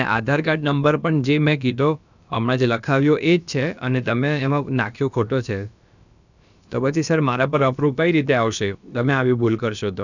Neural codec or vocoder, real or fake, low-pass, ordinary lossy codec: codec, 16 kHz, about 1 kbps, DyCAST, with the encoder's durations; fake; 7.2 kHz; none